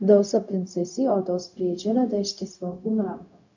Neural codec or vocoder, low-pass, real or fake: codec, 16 kHz, 0.4 kbps, LongCat-Audio-Codec; 7.2 kHz; fake